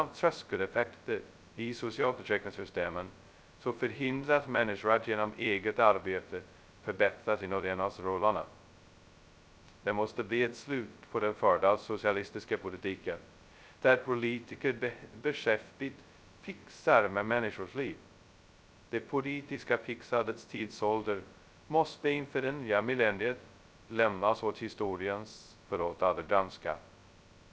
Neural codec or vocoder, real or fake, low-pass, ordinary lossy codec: codec, 16 kHz, 0.2 kbps, FocalCodec; fake; none; none